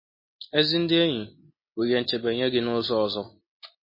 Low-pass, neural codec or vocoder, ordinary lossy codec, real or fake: 5.4 kHz; none; MP3, 24 kbps; real